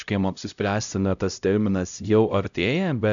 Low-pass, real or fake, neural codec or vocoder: 7.2 kHz; fake; codec, 16 kHz, 0.5 kbps, X-Codec, HuBERT features, trained on LibriSpeech